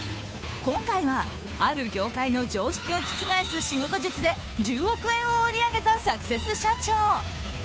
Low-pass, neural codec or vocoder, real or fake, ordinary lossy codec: none; codec, 16 kHz, 2 kbps, FunCodec, trained on Chinese and English, 25 frames a second; fake; none